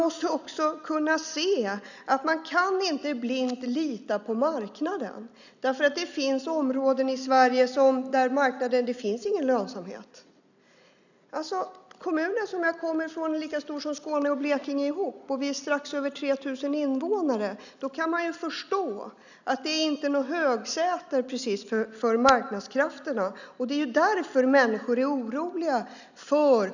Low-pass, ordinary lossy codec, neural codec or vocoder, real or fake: 7.2 kHz; none; none; real